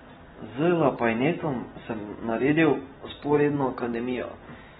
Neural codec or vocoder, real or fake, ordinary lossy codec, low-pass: none; real; AAC, 16 kbps; 19.8 kHz